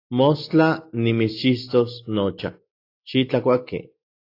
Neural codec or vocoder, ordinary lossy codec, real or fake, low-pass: none; AAC, 32 kbps; real; 5.4 kHz